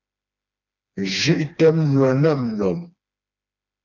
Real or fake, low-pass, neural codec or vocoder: fake; 7.2 kHz; codec, 16 kHz, 2 kbps, FreqCodec, smaller model